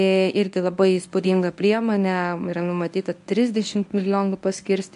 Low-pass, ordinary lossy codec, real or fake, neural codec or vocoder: 10.8 kHz; AAC, 96 kbps; fake; codec, 24 kHz, 0.9 kbps, WavTokenizer, medium speech release version 1